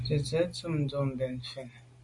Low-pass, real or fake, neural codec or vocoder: 10.8 kHz; real; none